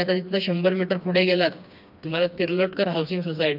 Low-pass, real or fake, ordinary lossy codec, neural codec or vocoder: 5.4 kHz; fake; none; codec, 16 kHz, 2 kbps, FreqCodec, smaller model